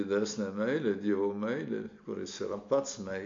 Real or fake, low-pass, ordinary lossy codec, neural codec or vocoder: real; 7.2 kHz; MP3, 48 kbps; none